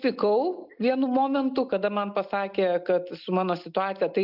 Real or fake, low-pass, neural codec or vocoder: real; 5.4 kHz; none